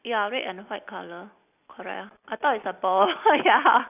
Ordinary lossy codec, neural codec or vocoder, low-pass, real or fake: none; none; 3.6 kHz; real